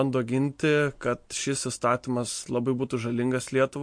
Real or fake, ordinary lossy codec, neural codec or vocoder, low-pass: real; MP3, 48 kbps; none; 9.9 kHz